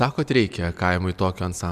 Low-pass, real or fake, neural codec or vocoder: 14.4 kHz; real; none